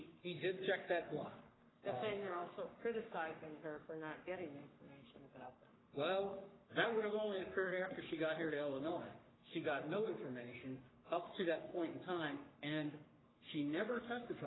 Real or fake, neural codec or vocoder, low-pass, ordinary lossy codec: fake; codec, 44.1 kHz, 3.4 kbps, Pupu-Codec; 7.2 kHz; AAC, 16 kbps